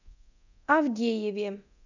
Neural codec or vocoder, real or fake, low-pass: codec, 24 kHz, 0.9 kbps, DualCodec; fake; 7.2 kHz